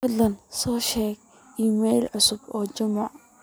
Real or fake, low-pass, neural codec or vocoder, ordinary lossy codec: real; none; none; none